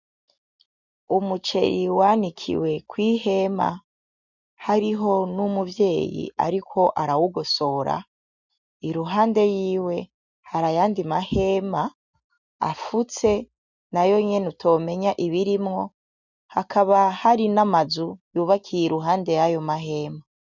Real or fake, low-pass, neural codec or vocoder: real; 7.2 kHz; none